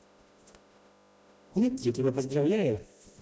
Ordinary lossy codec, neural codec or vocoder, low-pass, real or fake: none; codec, 16 kHz, 1 kbps, FreqCodec, smaller model; none; fake